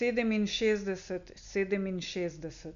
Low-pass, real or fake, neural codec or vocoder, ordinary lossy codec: 7.2 kHz; real; none; none